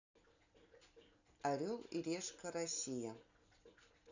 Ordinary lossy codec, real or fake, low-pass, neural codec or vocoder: none; real; 7.2 kHz; none